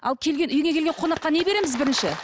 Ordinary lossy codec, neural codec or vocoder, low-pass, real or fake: none; none; none; real